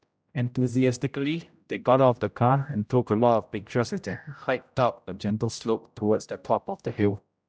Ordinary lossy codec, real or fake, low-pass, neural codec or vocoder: none; fake; none; codec, 16 kHz, 0.5 kbps, X-Codec, HuBERT features, trained on general audio